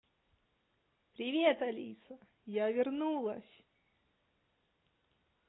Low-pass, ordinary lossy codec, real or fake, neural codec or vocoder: 7.2 kHz; AAC, 16 kbps; real; none